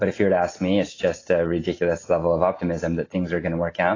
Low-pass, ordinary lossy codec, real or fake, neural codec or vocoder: 7.2 kHz; AAC, 32 kbps; real; none